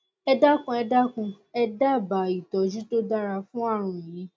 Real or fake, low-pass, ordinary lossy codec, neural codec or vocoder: real; none; none; none